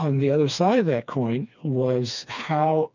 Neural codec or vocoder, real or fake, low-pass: codec, 16 kHz, 2 kbps, FreqCodec, smaller model; fake; 7.2 kHz